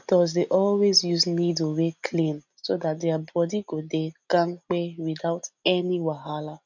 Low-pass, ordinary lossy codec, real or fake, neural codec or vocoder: 7.2 kHz; none; real; none